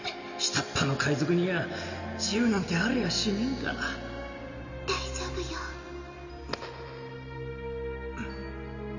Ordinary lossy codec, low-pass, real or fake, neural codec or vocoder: none; 7.2 kHz; real; none